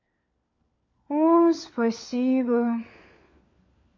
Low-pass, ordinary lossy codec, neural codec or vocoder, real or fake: 7.2 kHz; MP3, 48 kbps; codec, 16 kHz, 4 kbps, FunCodec, trained on LibriTTS, 50 frames a second; fake